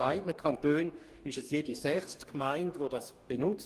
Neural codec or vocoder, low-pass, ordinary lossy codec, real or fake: codec, 44.1 kHz, 2.6 kbps, DAC; 14.4 kHz; Opus, 24 kbps; fake